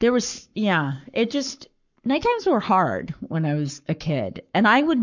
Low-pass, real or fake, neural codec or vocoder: 7.2 kHz; fake; codec, 44.1 kHz, 7.8 kbps, Pupu-Codec